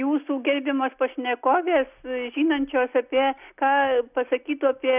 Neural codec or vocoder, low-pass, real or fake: none; 3.6 kHz; real